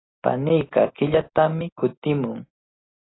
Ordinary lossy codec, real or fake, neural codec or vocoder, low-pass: AAC, 16 kbps; real; none; 7.2 kHz